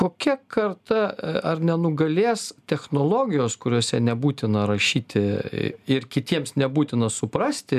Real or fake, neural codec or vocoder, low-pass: real; none; 14.4 kHz